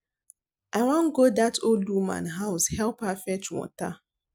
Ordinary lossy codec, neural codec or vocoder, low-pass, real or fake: none; none; none; real